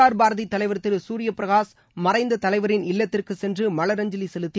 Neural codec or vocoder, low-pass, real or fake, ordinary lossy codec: none; none; real; none